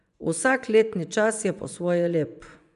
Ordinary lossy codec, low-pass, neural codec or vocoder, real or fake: none; 10.8 kHz; vocoder, 24 kHz, 100 mel bands, Vocos; fake